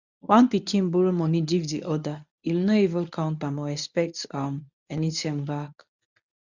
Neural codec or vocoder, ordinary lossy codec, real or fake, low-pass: codec, 24 kHz, 0.9 kbps, WavTokenizer, medium speech release version 1; none; fake; 7.2 kHz